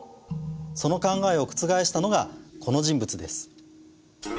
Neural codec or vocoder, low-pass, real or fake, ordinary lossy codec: none; none; real; none